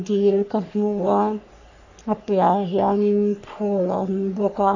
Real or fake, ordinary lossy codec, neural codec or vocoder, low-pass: fake; none; codec, 44.1 kHz, 3.4 kbps, Pupu-Codec; 7.2 kHz